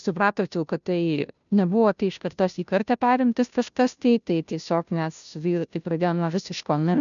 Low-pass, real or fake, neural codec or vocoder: 7.2 kHz; fake; codec, 16 kHz, 0.5 kbps, FunCodec, trained on Chinese and English, 25 frames a second